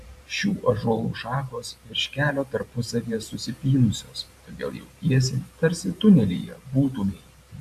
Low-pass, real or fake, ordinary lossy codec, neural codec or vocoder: 14.4 kHz; fake; AAC, 96 kbps; vocoder, 44.1 kHz, 128 mel bands every 512 samples, BigVGAN v2